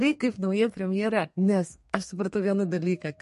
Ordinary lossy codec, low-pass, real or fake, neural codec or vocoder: MP3, 48 kbps; 14.4 kHz; fake; codec, 32 kHz, 1.9 kbps, SNAC